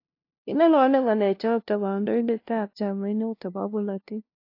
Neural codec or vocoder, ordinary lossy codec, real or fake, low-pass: codec, 16 kHz, 0.5 kbps, FunCodec, trained on LibriTTS, 25 frames a second; AAC, 32 kbps; fake; 5.4 kHz